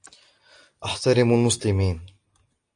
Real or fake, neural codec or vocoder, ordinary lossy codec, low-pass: real; none; AAC, 64 kbps; 9.9 kHz